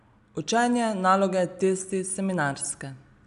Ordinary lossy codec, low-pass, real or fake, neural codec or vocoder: none; 10.8 kHz; real; none